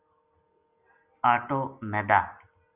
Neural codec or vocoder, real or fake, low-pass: none; real; 3.6 kHz